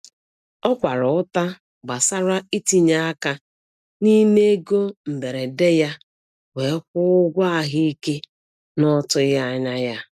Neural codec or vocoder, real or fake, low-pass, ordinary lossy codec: none; real; 14.4 kHz; none